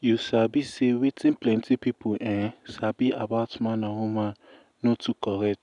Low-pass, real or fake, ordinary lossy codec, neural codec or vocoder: 10.8 kHz; real; none; none